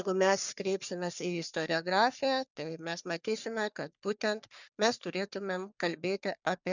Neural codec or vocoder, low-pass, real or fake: codec, 44.1 kHz, 3.4 kbps, Pupu-Codec; 7.2 kHz; fake